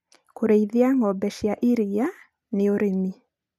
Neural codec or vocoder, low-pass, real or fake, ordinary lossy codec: none; 14.4 kHz; real; none